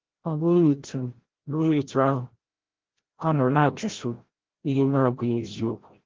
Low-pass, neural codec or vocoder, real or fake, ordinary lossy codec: 7.2 kHz; codec, 16 kHz, 0.5 kbps, FreqCodec, larger model; fake; Opus, 16 kbps